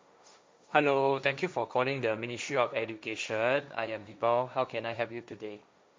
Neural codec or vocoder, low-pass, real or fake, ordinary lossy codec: codec, 16 kHz, 1.1 kbps, Voila-Tokenizer; none; fake; none